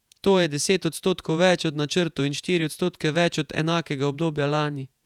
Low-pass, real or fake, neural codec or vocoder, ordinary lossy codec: 19.8 kHz; fake; vocoder, 48 kHz, 128 mel bands, Vocos; none